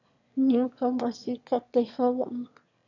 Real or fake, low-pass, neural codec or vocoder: fake; 7.2 kHz; autoencoder, 22.05 kHz, a latent of 192 numbers a frame, VITS, trained on one speaker